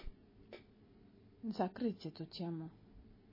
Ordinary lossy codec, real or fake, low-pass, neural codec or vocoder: MP3, 24 kbps; real; 5.4 kHz; none